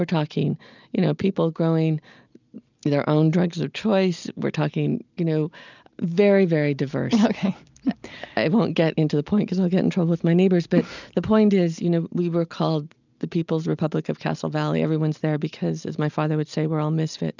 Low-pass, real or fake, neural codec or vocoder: 7.2 kHz; real; none